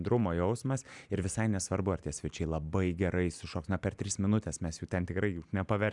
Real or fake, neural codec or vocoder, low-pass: real; none; 10.8 kHz